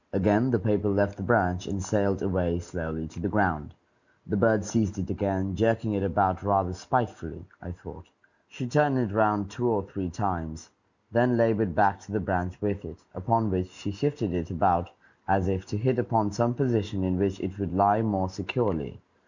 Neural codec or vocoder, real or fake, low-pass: none; real; 7.2 kHz